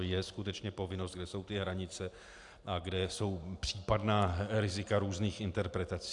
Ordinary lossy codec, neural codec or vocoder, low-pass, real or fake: AAC, 64 kbps; none; 10.8 kHz; real